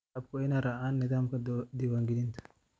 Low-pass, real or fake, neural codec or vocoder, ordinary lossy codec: none; real; none; none